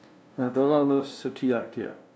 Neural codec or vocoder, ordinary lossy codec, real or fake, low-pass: codec, 16 kHz, 0.5 kbps, FunCodec, trained on LibriTTS, 25 frames a second; none; fake; none